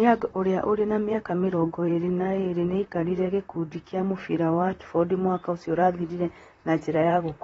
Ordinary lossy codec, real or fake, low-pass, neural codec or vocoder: AAC, 24 kbps; fake; 19.8 kHz; vocoder, 44.1 kHz, 128 mel bands, Pupu-Vocoder